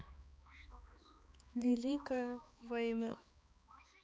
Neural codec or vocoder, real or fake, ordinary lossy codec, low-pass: codec, 16 kHz, 2 kbps, X-Codec, HuBERT features, trained on balanced general audio; fake; none; none